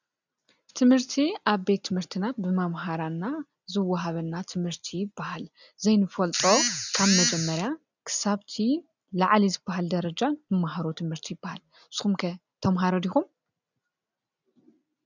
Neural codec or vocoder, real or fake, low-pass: none; real; 7.2 kHz